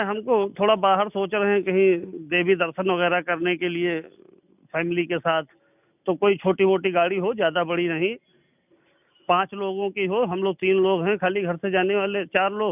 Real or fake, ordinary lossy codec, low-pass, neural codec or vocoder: real; none; 3.6 kHz; none